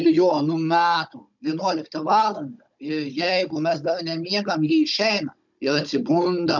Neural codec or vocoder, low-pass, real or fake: codec, 16 kHz, 16 kbps, FunCodec, trained on Chinese and English, 50 frames a second; 7.2 kHz; fake